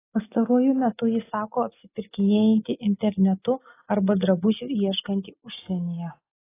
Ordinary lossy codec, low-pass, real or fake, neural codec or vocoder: AAC, 16 kbps; 3.6 kHz; real; none